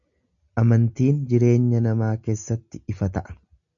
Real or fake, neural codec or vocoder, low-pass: real; none; 7.2 kHz